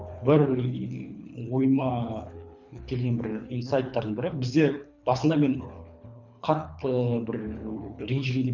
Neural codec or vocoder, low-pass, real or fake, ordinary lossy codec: codec, 24 kHz, 3 kbps, HILCodec; 7.2 kHz; fake; none